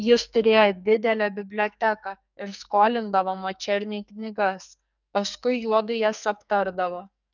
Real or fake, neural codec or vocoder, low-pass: fake; codec, 44.1 kHz, 2.6 kbps, SNAC; 7.2 kHz